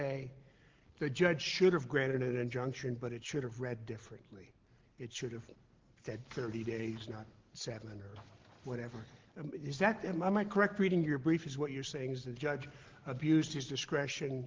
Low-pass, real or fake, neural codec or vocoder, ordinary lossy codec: 7.2 kHz; real; none; Opus, 16 kbps